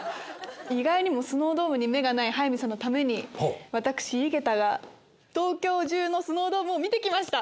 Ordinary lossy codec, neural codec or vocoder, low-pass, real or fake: none; none; none; real